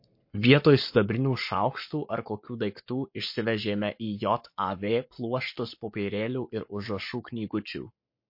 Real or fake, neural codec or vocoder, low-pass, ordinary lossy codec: fake; codec, 44.1 kHz, 7.8 kbps, Pupu-Codec; 5.4 kHz; MP3, 32 kbps